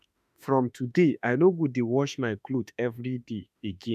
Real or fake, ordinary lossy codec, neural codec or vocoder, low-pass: fake; none; autoencoder, 48 kHz, 32 numbers a frame, DAC-VAE, trained on Japanese speech; 14.4 kHz